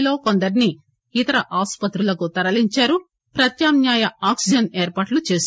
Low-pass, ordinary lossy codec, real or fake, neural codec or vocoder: none; none; real; none